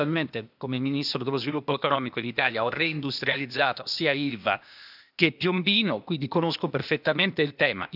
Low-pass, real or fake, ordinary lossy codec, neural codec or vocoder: 5.4 kHz; fake; none; codec, 16 kHz, 0.8 kbps, ZipCodec